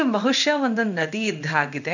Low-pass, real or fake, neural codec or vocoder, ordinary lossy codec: 7.2 kHz; fake; codec, 16 kHz in and 24 kHz out, 1 kbps, XY-Tokenizer; none